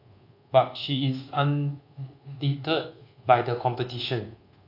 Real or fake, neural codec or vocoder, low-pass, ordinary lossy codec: fake; codec, 24 kHz, 1.2 kbps, DualCodec; 5.4 kHz; AAC, 32 kbps